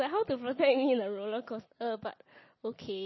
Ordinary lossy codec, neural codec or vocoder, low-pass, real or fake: MP3, 24 kbps; none; 7.2 kHz; real